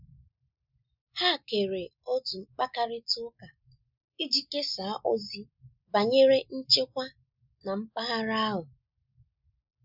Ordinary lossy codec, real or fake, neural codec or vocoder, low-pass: none; real; none; 5.4 kHz